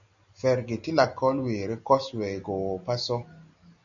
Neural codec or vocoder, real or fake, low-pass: none; real; 7.2 kHz